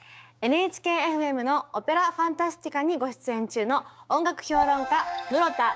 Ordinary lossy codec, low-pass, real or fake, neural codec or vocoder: none; none; fake; codec, 16 kHz, 6 kbps, DAC